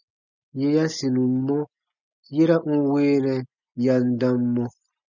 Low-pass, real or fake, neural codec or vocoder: 7.2 kHz; real; none